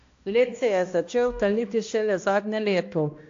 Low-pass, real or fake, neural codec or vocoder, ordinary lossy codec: 7.2 kHz; fake; codec, 16 kHz, 1 kbps, X-Codec, HuBERT features, trained on balanced general audio; AAC, 48 kbps